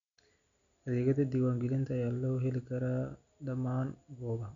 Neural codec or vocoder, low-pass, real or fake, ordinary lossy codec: none; 7.2 kHz; real; none